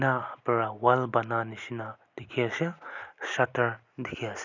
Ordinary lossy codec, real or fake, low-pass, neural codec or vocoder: none; real; 7.2 kHz; none